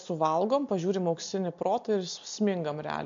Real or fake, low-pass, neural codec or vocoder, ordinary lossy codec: real; 7.2 kHz; none; MP3, 48 kbps